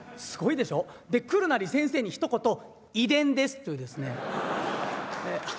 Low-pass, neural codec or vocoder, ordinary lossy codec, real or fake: none; none; none; real